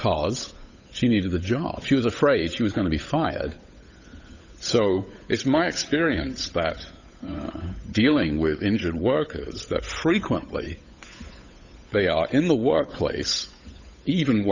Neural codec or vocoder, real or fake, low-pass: codec, 16 kHz, 8 kbps, FunCodec, trained on Chinese and English, 25 frames a second; fake; 7.2 kHz